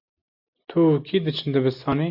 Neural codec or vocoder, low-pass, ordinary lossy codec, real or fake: none; 5.4 kHz; AAC, 48 kbps; real